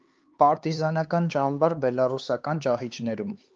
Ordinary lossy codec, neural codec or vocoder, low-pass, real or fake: Opus, 32 kbps; codec, 16 kHz, 4 kbps, X-Codec, HuBERT features, trained on LibriSpeech; 7.2 kHz; fake